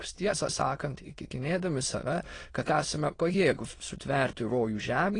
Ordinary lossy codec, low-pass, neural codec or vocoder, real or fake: AAC, 32 kbps; 9.9 kHz; autoencoder, 22.05 kHz, a latent of 192 numbers a frame, VITS, trained on many speakers; fake